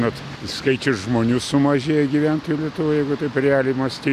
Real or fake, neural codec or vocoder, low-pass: real; none; 14.4 kHz